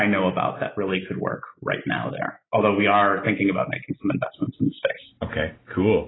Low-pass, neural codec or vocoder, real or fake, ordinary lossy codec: 7.2 kHz; none; real; AAC, 16 kbps